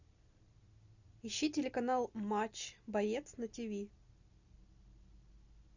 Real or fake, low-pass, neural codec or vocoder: real; 7.2 kHz; none